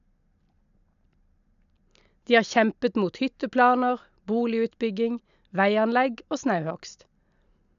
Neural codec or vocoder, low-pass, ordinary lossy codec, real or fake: none; 7.2 kHz; none; real